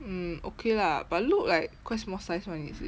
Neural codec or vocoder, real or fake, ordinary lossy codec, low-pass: none; real; none; none